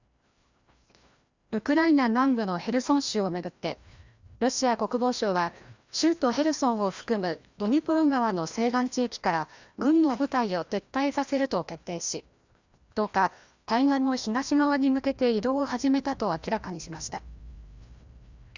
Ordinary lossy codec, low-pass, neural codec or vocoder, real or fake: Opus, 64 kbps; 7.2 kHz; codec, 16 kHz, 1 kbps, FreqCodec, larger model; fake